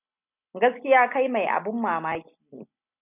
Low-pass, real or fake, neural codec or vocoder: 3.6 kHz; real; none